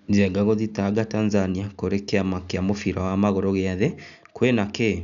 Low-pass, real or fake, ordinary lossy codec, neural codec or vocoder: 7.2 kHz; real; none; none